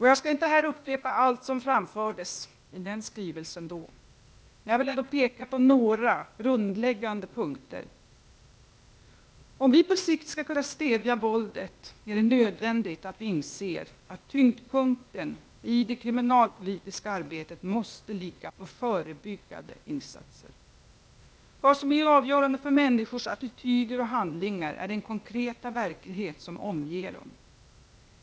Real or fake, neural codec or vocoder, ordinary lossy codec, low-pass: fake; codec, 16 kHz, 0.8 kbps, ZipCodec; none; none